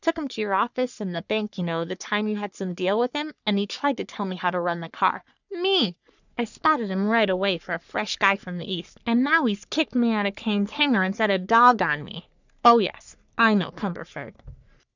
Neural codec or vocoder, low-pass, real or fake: codec, 44.1 kHz, 3.4 kbps, Pupu-Codec; 7.2 kHz; fake